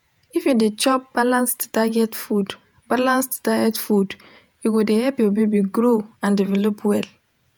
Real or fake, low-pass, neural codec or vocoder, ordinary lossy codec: fake; none; vocoder, 48 kHz, 128 mel bands, Vocos; none